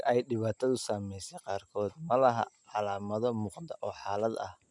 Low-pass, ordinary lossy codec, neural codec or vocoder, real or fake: 10.8 kHz; none; none; real